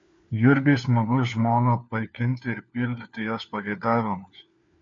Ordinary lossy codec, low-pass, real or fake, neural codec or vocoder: MP3, 48 kbps; 7.2 kHz; fake; codec, 16 kHz, 4 kbps, FunCodec, trained on LibriTTS, 50 frames a second